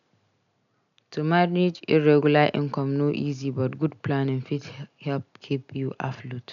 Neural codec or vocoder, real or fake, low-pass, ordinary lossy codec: none; real; 7.2 kHz; none